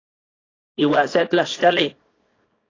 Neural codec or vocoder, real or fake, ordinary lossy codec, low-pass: codec, 24 kHz, 0.9 kbps, WavTokenizer, small release; fake; AAC, 32 kbps; 7.2 kHz